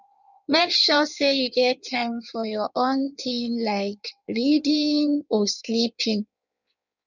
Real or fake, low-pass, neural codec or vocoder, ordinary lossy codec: fake; 7.2 kHz; codec, 16 kHz in and 24 kHz out, 1.1 kbps, FireRedTTS-2 codec; none